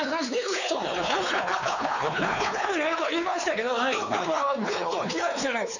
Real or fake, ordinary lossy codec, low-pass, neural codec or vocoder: fake; none; 7.2 kHz; codec, 16 kHz, 4 kbps, X-Codec, WavLM features, trained on Multilingual LibriSpeech